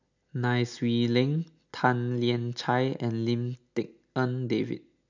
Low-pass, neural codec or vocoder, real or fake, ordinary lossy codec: 7.2 kHz; none; real; none